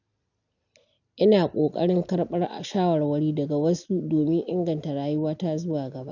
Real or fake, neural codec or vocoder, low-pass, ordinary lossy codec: real; none; 7.2 kHz; none